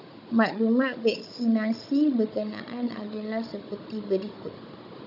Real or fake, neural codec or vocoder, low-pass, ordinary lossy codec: fake; codec, 16 kHz, 16 kbps, FunCodec, trained on Chinese and English, 50 frames a second; 5.4 kHz; AAC, 48 kbps